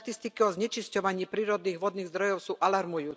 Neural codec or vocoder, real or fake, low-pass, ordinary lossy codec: none; real; none; none